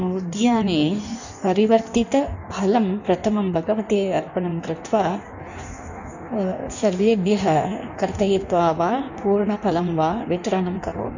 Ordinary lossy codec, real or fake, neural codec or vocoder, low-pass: none; fake; codec, 16 kHz in and 24 kHz out, 1.1 kbps, FireRedTTS-2 codec; 7.2 kHz